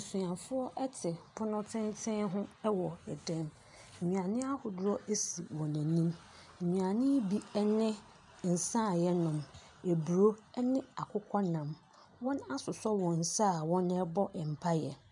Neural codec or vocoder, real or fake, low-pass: none; real; 10.8 kHz